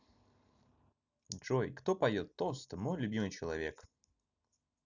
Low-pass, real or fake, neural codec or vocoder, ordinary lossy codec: 7.2 kHz; real; none; none